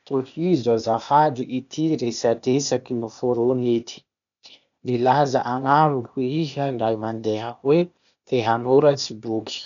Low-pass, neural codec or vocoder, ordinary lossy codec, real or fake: 7.2 kHz; codec, 16 kHz, 0.8 kbps, ZipCodec; none; fake